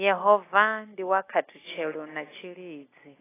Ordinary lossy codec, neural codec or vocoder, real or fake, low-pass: AAC, 16 kbps; none; real; 3.6 kHz